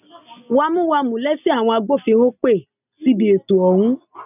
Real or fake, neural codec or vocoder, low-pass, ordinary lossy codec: real; none; 3.6 kHz; none